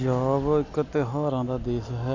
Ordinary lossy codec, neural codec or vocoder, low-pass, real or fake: none; none; 7.2 kHz; real